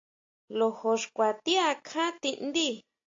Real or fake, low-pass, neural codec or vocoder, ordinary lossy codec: real; 7.2 kHz; none; AAC, 48 kbps